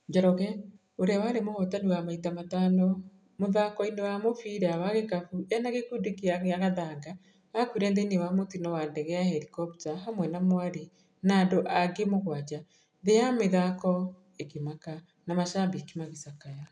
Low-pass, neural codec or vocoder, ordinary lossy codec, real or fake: 9.9 kHz; none; none; real